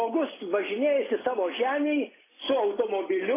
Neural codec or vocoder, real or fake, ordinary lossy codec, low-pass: none; real; AAC, 16 kbps; 3.6 kHz